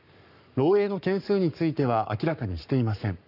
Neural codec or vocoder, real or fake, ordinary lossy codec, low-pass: codec, 44.1 kHz, 7.8 kbps, Pupu-Codec; fake; none; 5.4 kHz